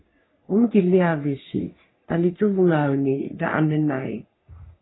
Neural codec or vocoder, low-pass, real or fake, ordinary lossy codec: codec, 44.1 kHz, 3.4 kbps, Pupu-Codec; 7.2 kHz; fake; AAC, 16 kbps